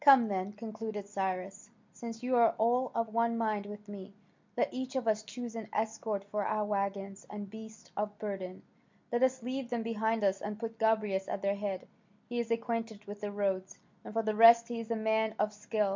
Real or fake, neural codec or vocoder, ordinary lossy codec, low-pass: real; none; MP3, 64 kbps; 7.2 kHz